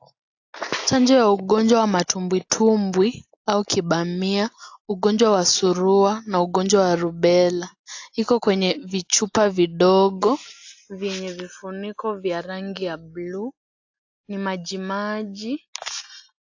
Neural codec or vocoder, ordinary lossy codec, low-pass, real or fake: none; AAC, 48 kbps; 7.2 kHz; real